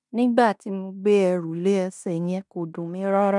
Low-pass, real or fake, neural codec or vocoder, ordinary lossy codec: 10.8 kHz; fake; codec, 16 kHz in and 24 kHz out, 0.9 kbps, LongCat-Audio-Codec, fine tuned four codebook decoder; none